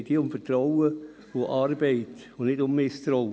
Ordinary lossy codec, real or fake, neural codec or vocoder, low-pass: none; real; none; none